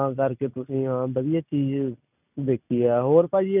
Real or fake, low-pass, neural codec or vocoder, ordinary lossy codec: real; 3.6 kHz; none; none